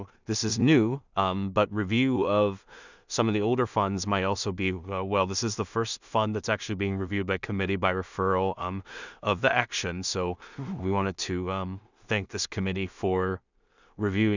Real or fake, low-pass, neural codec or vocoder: fake; 7.2 kHz; codec, 16 kHz in and 24 kHz out, 0.4 kbps, LongCat-Audio-Codec, two codebook decoder